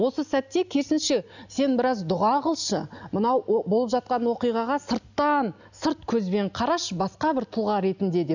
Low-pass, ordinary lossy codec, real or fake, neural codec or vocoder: 7.2 kHz; none; real; none